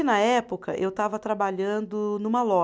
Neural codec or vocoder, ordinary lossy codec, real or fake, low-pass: none; none; real; none